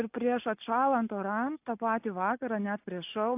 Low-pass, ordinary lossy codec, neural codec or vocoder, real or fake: 3.6 kHz; AAC, 32 kbps; none; real